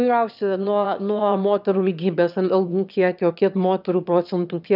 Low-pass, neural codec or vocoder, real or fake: 5.4 kHz; autoencoder, 22.05 kHz, a latent of 192 numbers a frame, VITS, trained on one speaker; fake